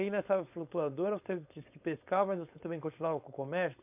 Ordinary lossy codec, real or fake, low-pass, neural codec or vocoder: AAC, 32 kbps; fake; 3.6 kHz; codec, 16 kHz, 4.8 kbps, FACodec